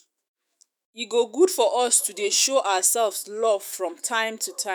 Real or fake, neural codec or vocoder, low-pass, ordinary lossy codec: fake; autoencoder, 48 kHz, 128 numbers a frame, DAC-VAE, trained on Japanese speech; none; none